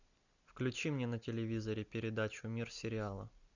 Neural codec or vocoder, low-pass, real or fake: none; 7.2 kHz; real